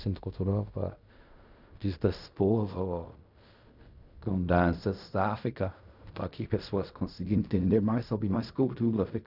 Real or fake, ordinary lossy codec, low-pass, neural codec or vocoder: fake; none; 5.4 kHz; codec, 16 kHz in and 24 kHz out, 0.4 kbps, LongCat-Audio-Codec, fine tuned four codebook decoder